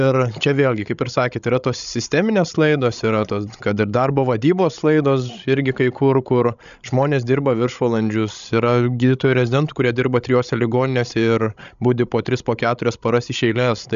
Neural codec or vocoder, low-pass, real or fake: codec, 16 kHz, 16 kbps, FreqCodec, larger model; 7.2 kHz; fake